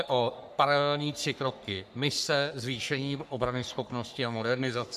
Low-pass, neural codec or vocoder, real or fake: 14.4 kHz; codec, 44.1 kHz, 3.4 kbps, Pupu-Codec; fake